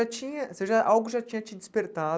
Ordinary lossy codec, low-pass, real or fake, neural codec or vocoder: none; none; real; none